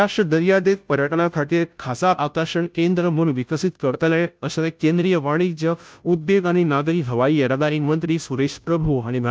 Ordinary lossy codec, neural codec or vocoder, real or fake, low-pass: none; codec, 16 kHz, 0.5 kbps, FunCodec, trained on Chinese and English, 25 frames a second; fake; none